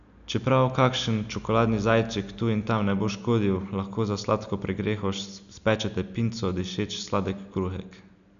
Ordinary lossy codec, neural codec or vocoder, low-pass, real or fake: none; none; 7.2 kHz; real